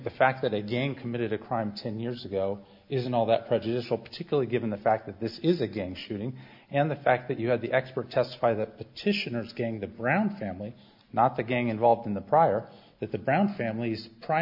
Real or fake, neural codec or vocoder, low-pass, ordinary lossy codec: real; none; 5.4 kHz; MP3, 48 kbps